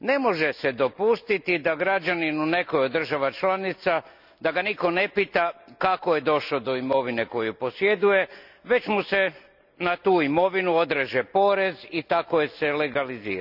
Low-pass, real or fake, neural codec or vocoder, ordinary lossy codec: 5.4 kHz; real; none; none